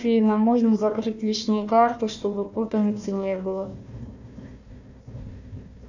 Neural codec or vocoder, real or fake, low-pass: codec, 16 kHz, 1 kbps, FunCodec, trained on Chinese and English, 50 frames a second; fake; 7.2 kHz